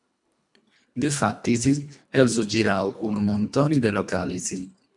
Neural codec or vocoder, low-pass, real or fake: codec, 24 kHz, 1.5 kbps, HILCodec; 10.8 kHz; fake